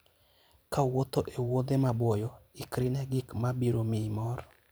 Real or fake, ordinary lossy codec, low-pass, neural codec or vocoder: fake; none; none; vocoder, 44.1 kHz, 128 mel bands every 512 samples, BigVGAN v2